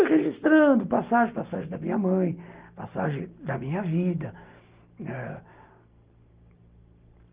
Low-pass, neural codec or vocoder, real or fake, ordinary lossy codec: 3.6 kHz; none; real; Opus, 32 kbps